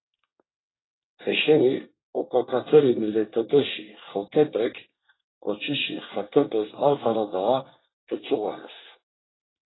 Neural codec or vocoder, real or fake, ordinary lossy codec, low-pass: codec, 24 kHz, 1 kbps, SNAC; fake; AAC, 16 kbps; 7.2 kHz